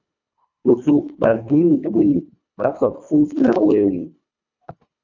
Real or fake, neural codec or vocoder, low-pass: fake; codec, 24 kHz, 1.5 kbps, HILCodec; 7.2 kHz